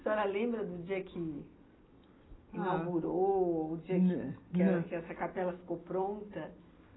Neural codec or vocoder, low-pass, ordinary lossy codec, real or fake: none; 7.2 kHz; AAC, 16 kbps; real